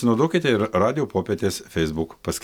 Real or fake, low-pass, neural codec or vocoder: real; 19.8 kHz; none